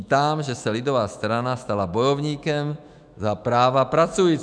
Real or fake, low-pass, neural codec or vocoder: fake; 9.9 kHz; autoencoder, 48 kHz, 128 numbers a frame, DAC-VAE, trained on Japanese speech